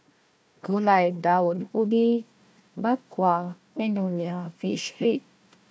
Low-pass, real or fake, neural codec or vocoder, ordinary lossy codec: none; fake; codec, 16 kHz, 1 kbps, FunCodec, trained on Chinese and English, 50 frames a second; none